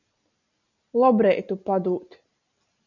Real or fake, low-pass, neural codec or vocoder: real; 7.2 kHz; none